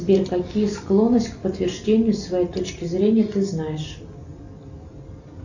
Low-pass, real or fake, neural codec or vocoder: 7.2 kHz; real; none